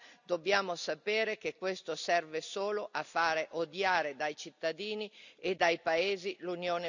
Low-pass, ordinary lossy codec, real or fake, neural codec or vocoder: 7.2 kHz; none; real; none